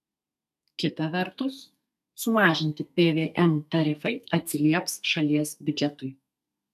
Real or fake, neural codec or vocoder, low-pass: fake; codec, 32 kHz, 1.9 kbps, SNAC; 14.4 kHz